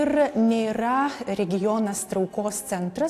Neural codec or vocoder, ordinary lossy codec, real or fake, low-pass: none; AAC, 64 kbps; real; 14.4 kHz